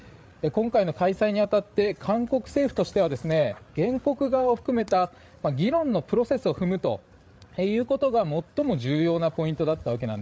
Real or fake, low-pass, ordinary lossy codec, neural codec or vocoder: fake; none; none; codec, 16 kHz, 8 kbps, FreqCodec, larger model